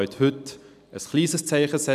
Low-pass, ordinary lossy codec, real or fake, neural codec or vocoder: 14.4 kHz; none; real; none